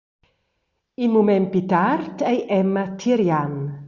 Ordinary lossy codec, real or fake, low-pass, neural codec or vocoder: Opus, 64 kbps; real; 7.2 kHz; none